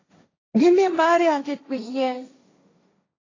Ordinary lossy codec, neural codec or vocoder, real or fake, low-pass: AAC, 32 kbps; codec, 16 kHz, 1.1 kbps, Voila-Tokenizer; fake; 7.2 kHz